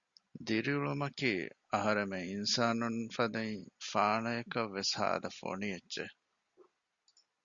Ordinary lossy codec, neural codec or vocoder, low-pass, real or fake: Opus, 64 kbps; none; 7.2 kHz; real